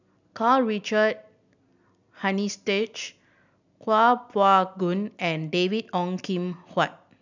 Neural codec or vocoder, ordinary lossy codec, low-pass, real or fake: none; none; 7.2 kHz; real